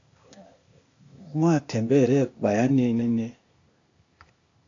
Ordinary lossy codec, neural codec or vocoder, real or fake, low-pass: AAC, 48 kbps; codec, 16 kHz, 0.8 kbps, ZipCodec; fake; 7.2 kHz